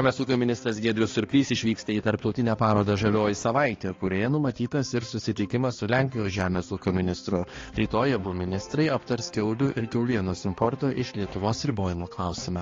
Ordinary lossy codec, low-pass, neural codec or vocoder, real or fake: AAC, 32 kbps; 7.2 kHz; codec, 16 kHz, 2 kbps, X-Codec, HuBERT features, trained on balanced general audio; fake